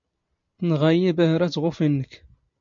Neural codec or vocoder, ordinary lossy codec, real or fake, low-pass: none; MP3, 48 kbps; real; 7.2 kHz